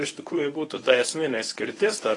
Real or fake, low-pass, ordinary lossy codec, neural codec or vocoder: fake; 10.8 kHz; AAC, 32 kbps; codec, 24 kHz, 0.9 kbps, WavTokenizer, medium speech release version 1